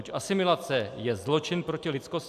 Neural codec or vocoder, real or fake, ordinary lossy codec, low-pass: none; real; AAC, 96 kbps; 14.4 kHz